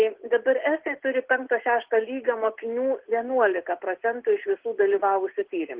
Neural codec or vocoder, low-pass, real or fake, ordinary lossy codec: none; 3.6 kHz; real; Opus, 16 kbps